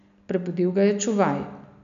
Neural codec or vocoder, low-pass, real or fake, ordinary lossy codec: none; 7.2 kHz; real; none